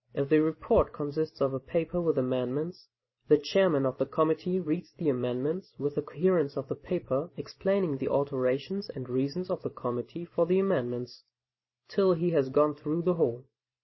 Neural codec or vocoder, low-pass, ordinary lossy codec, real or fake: vocoder, 44.1 kHz, 128 mel bands every 512 samples, BigVGAN v2; 7.2 kHz; MP3, 24 kbps; fake